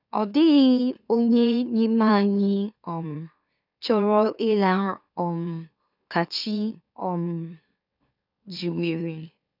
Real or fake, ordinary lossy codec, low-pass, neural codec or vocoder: fake; none; 5.4 kHz; autoencoder, 44.1 kHz, a latent of 192 numbers a frame, MeloTTS